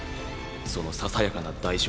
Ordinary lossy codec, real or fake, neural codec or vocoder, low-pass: none; real; none; none